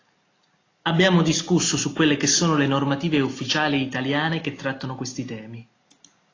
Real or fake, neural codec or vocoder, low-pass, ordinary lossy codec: real; none; 7.2 kHz; AAC, 32 kbps